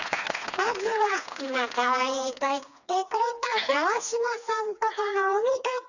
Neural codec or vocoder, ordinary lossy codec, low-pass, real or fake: codec, 16 kHz, 2 kbps, FreqCodec, smaller model; none; 7.2 kHz; fake